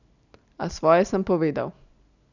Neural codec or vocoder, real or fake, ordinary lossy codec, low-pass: none; real; none; 7.2 kHz